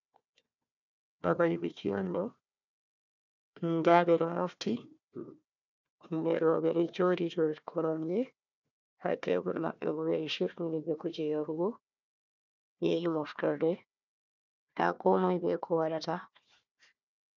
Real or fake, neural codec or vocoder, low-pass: fake; codec, 16 kHz, 1 kbps, FunCodec, trained on Chinese and English, 50 frames a second; 7.2 kHz